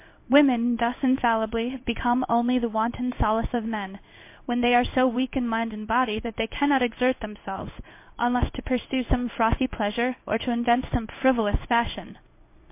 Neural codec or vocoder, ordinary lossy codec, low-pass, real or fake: codec, 16 kHz in and 24 kHz out, 1 kbps, XY-Tokenizer; MP3, 24 kbps; 3.6 kHz; fake